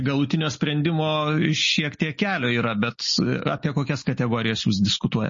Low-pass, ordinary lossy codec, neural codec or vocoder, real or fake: 7.2 kHz; MP3, 32 kbps; none; real